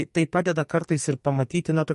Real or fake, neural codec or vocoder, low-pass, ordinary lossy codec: fake; codec, 44.1 kHz, 2.6 kbps, SNAC; 14.4 kHz; MP3, 48 kbps